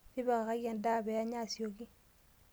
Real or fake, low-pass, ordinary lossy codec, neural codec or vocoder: real; none; none; none